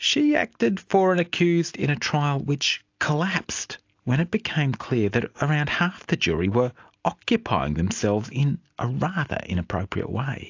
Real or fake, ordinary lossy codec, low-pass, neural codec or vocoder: real; AAC, 48 kbps; 7.2 kHz; none